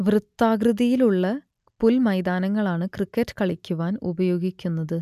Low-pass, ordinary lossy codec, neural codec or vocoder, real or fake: 14.4 kHz; none; none; real